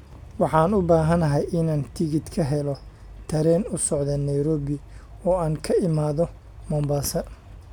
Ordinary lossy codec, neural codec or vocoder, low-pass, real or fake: none; none; 19.8 kHz; real